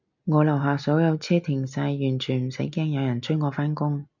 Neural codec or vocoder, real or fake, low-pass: none; real; 7.2 kHz